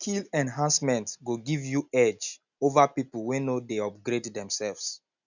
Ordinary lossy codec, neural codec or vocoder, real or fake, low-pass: none; none; real; 7.2 kHz